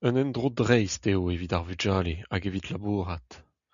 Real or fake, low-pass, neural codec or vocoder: real; 7.2 kHz; none